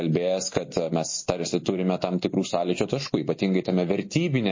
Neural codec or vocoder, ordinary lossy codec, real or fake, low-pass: none; MP3, 32 kbps; real; 7.2 kHz